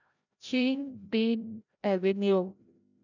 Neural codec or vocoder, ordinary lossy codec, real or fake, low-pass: codec, 16 kHz, 0.5 kbps, FreqCodec, larger model; none; fake; 7.2 kHz